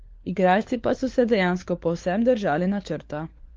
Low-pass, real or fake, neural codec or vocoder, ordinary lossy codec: 7.2 kHz; fake; codec, 16 kHz, 4 kbps, FunCodec, trained on LibriTTS, 50 frames a second; Opus, 32 kbps